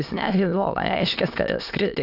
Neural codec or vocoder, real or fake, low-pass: autoencoder, 22.05 kHz, a latent of 192 numbers a frame, VITS, trained on many speakers; fake; 5.4 kHz